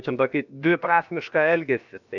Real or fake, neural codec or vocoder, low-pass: fake; codec, 16 kHz, about 1 kbps, DyCAST, with the encoder's durations; 7.2 kHz